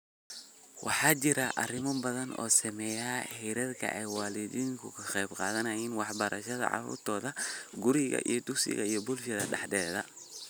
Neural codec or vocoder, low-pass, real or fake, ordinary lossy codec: none; none; real; none